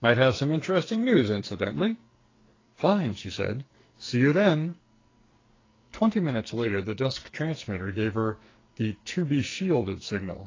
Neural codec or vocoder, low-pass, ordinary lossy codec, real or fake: codec, 44.1 kHz, 2.6 kbps, SNAC; 7.2 kHz; AAC, 32 kbps; fake